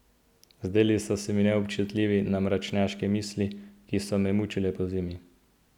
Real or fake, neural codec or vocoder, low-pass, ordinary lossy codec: real; none; 19.8 kHz; none